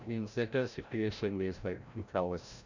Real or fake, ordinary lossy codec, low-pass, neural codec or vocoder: fake; none; 7.2 kHz; codec, 16 kHz, 0.5 kbps, FreqCodec, larger model